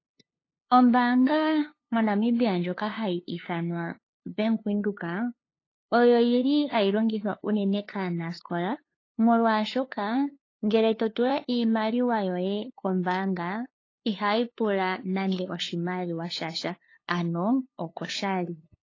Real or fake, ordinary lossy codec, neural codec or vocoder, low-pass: fake; AAC, 32 kbps; codec, 16 kHz, 2 kbps, FunCodec, trained on LibriTTS, 25 frames a second; 7.2 kHz